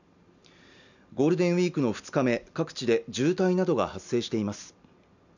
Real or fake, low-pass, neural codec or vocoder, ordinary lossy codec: real; 7.2 kHz; none; none